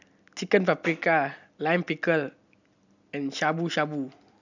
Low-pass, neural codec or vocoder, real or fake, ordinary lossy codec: 7.2 kHz; none; real; none